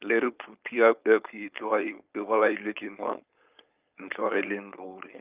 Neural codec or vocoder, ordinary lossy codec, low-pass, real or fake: codec, 16 kHz, 4.8 kbps, FACodec; Opus, 24 kbps; 3.6 kHz; fake